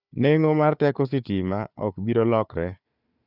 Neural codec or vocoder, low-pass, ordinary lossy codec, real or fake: codec, 16 kHz, 4 kbps, FunCodec, trained on Chinese and English, 50 frames a second; 5.4 kHz; none; fake